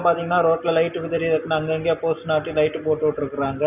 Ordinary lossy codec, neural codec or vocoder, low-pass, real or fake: none; none; 3.6 kHz; real